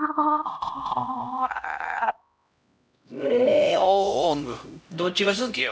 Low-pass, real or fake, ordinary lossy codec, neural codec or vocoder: none; fake; none; codec, 16 kHz, 0.5 kbps, X-Codec, HuBERT features, trained on LibriSpeech